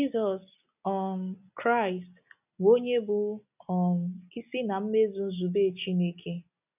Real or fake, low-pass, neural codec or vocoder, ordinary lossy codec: real; 3.6 kHz; none; none